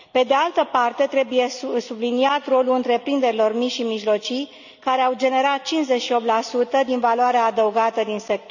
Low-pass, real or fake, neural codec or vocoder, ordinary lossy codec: 7.2 kHz; real; none; none